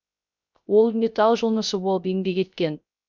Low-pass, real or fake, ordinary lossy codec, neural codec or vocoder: 7.2 kHz; fake; none; codec, 16 kHz, 0.3 kbps, FocalCodec